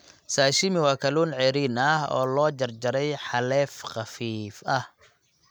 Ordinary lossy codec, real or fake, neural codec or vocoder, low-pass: none; real; none; none